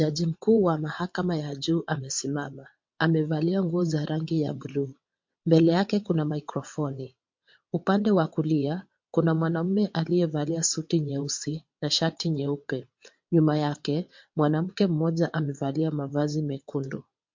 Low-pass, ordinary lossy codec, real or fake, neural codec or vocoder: 7.2 kHz; MP3, 48 kbps; fake; vocoder, 22.05 kHz, 80 mel bands, WaveNeXt